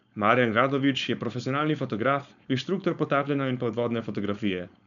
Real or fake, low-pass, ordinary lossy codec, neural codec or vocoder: fake; 7.2 kHz; none; codec, 16 kHz, 4.8 kbps, FACodec